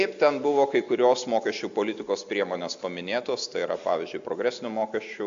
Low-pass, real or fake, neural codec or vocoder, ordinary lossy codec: 7.2 kHz; real; none; MP3, 64 kbps